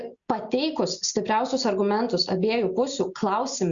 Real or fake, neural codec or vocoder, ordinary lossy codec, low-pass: real; none; Opus, 64 kbps; 7.2 kHz